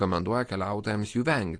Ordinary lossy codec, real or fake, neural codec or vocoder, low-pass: AAC, 48 kbps; real; none; 9.9 kHz